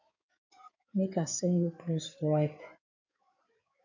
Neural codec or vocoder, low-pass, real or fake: codec, 16 kHz in and 24 kHz out, 2.2 kbps, FireRedTTS-2 codec; 7.2 kHz; fake